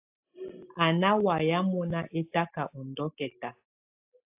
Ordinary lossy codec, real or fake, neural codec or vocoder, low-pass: AAC, 32 kbps; real; none; 3.6 kHz